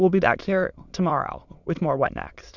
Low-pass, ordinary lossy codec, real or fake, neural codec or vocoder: 7.2 kHz; Opus, 64 kbps; fake; autoencoder, 22.05 kHz, a latent of 192 numbers a frame, VITS, trained on many speakers